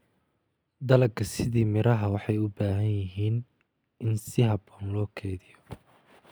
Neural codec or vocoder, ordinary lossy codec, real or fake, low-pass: none; none; real; none